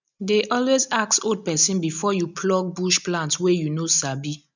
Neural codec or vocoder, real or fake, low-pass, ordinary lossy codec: none; real; 7.2 kHz; none